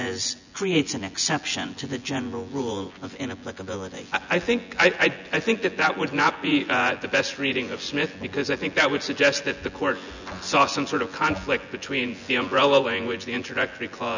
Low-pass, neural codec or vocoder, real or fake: 7.2 kHz; vocoder, 24 kHz, 100 mel bands, Vocos; fake